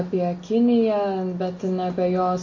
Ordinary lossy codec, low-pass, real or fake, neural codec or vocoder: MP3, 32 kbps; 7.2 kHz; real; none